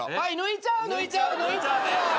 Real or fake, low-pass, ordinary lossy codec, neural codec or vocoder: real; none; none; none